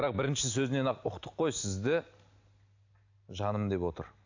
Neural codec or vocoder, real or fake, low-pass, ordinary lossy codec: none; real; 7.2 kHz; AAC, 48 kbps